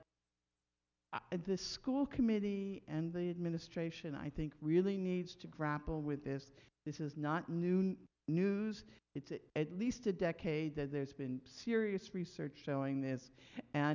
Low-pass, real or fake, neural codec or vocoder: 7.2 kHz; real; none